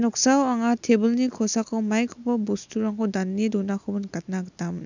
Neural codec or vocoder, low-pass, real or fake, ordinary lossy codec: none; 7.2 kHz; real; none